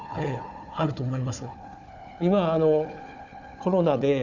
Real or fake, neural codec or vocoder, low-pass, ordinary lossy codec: fake; codec, 16 kHz, 4 kbps, FunCodec, trained on Chinese and English, 50 frames a second; 7.2 kHz; none